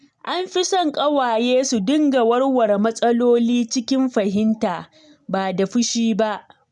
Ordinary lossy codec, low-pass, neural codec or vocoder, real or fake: none; 10.8 kHz; none; real